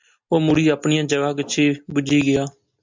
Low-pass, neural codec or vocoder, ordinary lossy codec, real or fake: 7.2 kHz; none; MP3, 64 kbps; real